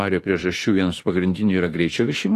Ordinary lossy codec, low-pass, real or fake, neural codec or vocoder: AAC, 48 kbps; 14.4 kHz; fake; autoencoder, 48 kHz, 32 numbers a frame, DAC-VAE, trained on Japanese speech